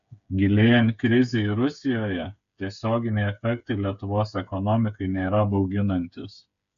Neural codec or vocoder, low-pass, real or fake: codec, 16 kHz, 8 kbps, FreqCodec, smaller model; 7.2 kHz; fake